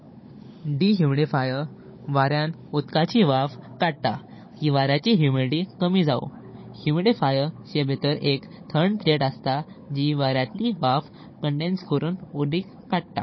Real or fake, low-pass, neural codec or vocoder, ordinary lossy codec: fake; 7.2 kHz; codec, 16 kHz, 16 kbps, FunCodec, trained on Chinese and English, 50 frames a second; MP3, 24 kbps